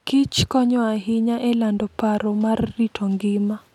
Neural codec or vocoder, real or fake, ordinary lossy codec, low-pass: none; real; none; 19.8 kHz